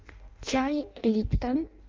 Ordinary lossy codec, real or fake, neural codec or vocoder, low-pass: Opus, 24 kbps; fake; codec, 16 kHz in and 24 kHz out, 0.6 kbps, FireRedTTS-2 codec; 7.2 kHz